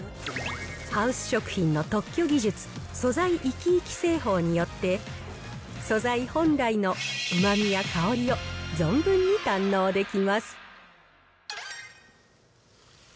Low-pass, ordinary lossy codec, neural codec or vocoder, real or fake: none; none; none; real